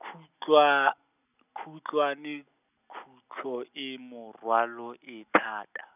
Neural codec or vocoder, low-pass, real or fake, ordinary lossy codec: none; 3.6 kHz; real; none